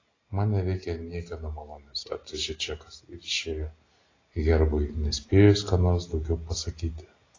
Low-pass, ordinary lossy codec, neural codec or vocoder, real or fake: 7.2 kHz; AAC, 32 kbps; none; real